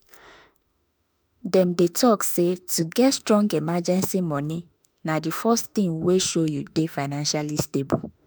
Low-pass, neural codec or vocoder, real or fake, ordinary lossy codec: none; autoencoder, 48 kHz, 32 numbers a frame, DAC-VAE, trained on Japanese speech; fake; none